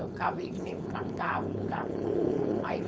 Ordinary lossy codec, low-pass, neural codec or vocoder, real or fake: none; none; codec, 16 kHz, 4.8 kbps, FACodec; fake